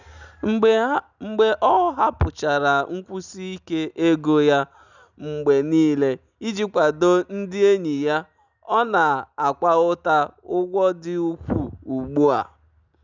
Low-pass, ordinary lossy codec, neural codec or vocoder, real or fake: 7.2 kHz; none; none; real